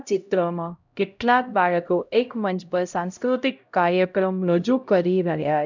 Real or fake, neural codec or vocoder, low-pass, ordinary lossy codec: fake; codec, 16 kHz, 0.5 kbps, X-Codec, HuBERT features, trained on LibriSpeech; 7.2 kHz; none